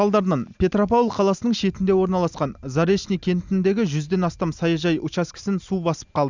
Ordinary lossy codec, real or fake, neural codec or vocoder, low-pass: Opus, 64 kbps; real; none; 7.2 kHz